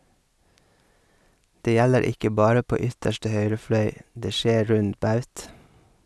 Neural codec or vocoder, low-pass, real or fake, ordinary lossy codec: none; none; real; none